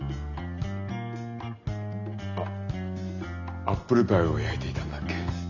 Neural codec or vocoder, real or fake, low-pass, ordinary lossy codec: none; real; 7.2 kHz; none